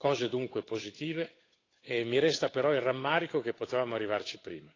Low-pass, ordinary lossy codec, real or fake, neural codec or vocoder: 7.2 kHz; AAC, 32 kbps; fake; codec, 16 kHz, 8 kbps, FunCodec, trained on Chinese and English, 25 frames a second